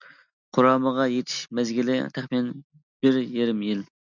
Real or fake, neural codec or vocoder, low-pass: real; none; 7.2 kHz